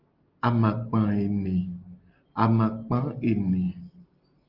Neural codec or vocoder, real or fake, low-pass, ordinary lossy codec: none; real; 5.4 kHz; Opus, 32 kbps